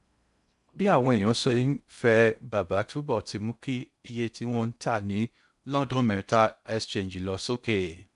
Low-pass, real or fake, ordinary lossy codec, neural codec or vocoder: 10.8 kHz; fake; none; codec, 16 kHz in and 24 kHz out, 0.6 kbps, FocalCodec, streaming, 4096 codes